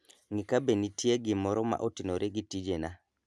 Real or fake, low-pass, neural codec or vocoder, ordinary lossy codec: real; none; none; none